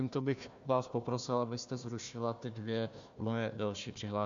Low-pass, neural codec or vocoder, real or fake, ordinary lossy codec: 7.2 kHz; codec, 16 kHz, 1 kbps, FunCodec, trained on Chinese and English, 50 frames a second; fake; MP3, 64 kbps